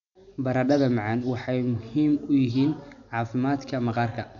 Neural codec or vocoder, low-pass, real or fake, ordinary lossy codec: none; 7.2 kHz; real; none